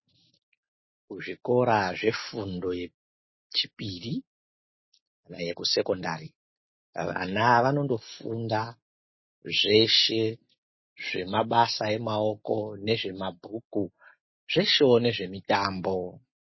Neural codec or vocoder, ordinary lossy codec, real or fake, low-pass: none; MP3, 24 kbps; real; 7.2 kHz